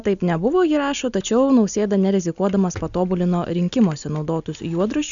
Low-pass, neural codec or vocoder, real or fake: 7.2 kHz; none; real